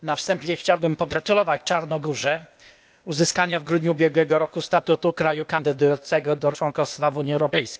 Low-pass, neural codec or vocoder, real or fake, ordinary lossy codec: none; codec, 16 kHz, 0.8 kbps, ZipCodec; fake; none